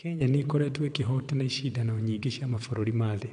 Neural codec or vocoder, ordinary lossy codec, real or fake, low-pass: vocoder, 22.05 kHz, 80 mel bands, WaveNeXt; AAC, 64 kbps; fake; 9.9 kHz